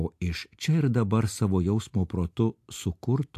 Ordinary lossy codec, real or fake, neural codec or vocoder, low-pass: AAC, 64 kbps; real; none; 14.4 kHz